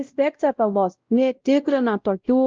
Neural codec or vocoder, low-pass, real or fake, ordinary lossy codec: codec, 16 kHz, 0.5 kbps, X-Codec, WavLM features, trained on Multilingual LibriSpeech; 7.2 kHz; fake; Opus, 24 kbps